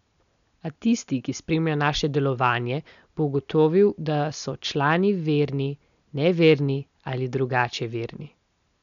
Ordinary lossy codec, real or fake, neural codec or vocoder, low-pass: none; real; none; 7.2 kHz